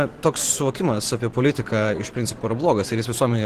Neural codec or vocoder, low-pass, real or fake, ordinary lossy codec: vocoder, 44.1 kHz, 128 mel bands every 512 samples, BigVGAN v2; 14.4 kHz; fake; Opus, 16 kbps